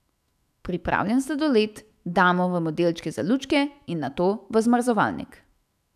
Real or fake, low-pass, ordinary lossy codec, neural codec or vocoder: fake; 14.4 kHz; none; autoencoder, 48 kHz, 128 numbers a frame, DAC-VAE, trained on Japanese speech